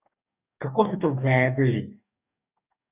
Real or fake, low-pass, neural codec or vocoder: fake; 3.6 kHz; codec, 44.1 kHz, 2.6 kbps, DAC